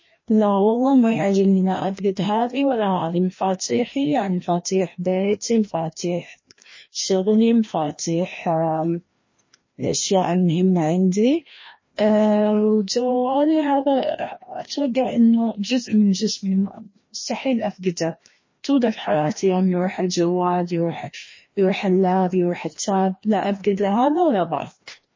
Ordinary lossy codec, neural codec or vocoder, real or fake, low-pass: MP3, 32 kbps; codec, 16 kHz, 1 kbps, FreqCodec, larger model; fake; 7.2 kHz